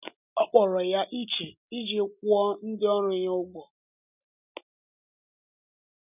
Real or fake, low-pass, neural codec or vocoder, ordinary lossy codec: real; 3.6 kHz; none; none